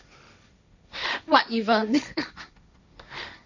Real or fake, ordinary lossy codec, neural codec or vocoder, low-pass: fake; none; codec, 16 kHz, 1.1 kbps, Voila-Tokenizer; 7.2 kHz